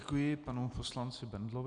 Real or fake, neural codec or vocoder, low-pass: real; none; 9.9 kHz